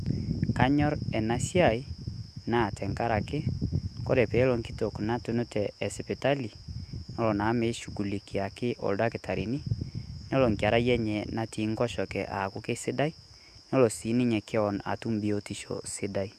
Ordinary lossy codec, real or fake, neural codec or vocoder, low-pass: Opus, 64 kbps; fake; autoencoder, 48 kHz, 128 numbers a frame, DAC-VAE, trained on Japanese speech; 14.4 kHz